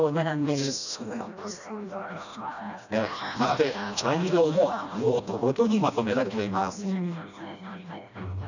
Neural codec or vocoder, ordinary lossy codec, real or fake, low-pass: codec, 16 kHz, 1 kbps, FreqCodec, smaller model; none; fake; 7.2 kHz